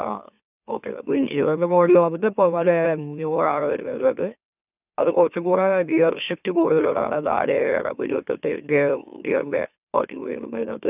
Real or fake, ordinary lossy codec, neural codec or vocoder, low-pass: fake; none; autoencoder, 44.1 kHz, a latent of 192 numbers a frame, MeloTTS; 3.6 kHz